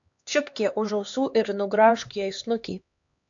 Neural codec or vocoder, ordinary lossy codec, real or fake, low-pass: codec, 16 kHz, 2 kbps, X-Codec, HuBERT features, trained on LibriSpeech; AAC, 64 kbps; fake; 7.2 kHz